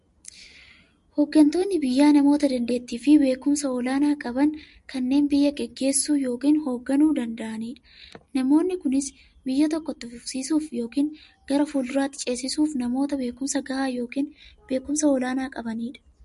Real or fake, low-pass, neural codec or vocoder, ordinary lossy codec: real; 14.4 kHz; none; MP3, 48 kbps